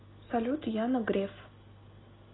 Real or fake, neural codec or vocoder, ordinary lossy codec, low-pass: real; none; AAC, 16 kbps; 7.2 kHz